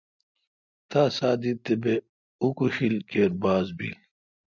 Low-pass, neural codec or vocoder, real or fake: 7.2 kHz; none; real